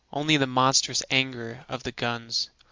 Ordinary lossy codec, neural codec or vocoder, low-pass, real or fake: Opus, 32 kbps; none; 7.2 kHz; real